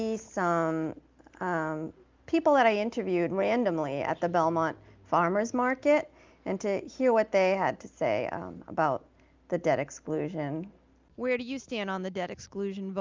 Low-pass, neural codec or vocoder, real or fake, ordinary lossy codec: 7.2 kHz; none; real; Opus, 24 kbps